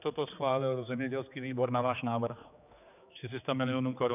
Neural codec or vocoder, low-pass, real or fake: codec, 16 kHz, 4 kbps, X-Codec, HuBERT features, trained on general audio; 3.6 kHz; fake